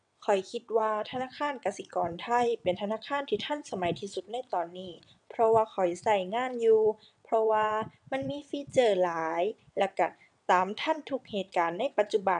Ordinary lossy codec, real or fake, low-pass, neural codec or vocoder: none; fake; 9.9 kHz; vocoder, 22.05 kHz, 80 mel bands, WaveNeXt